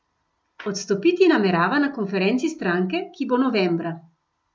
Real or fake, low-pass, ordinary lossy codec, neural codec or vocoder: real; none; none; none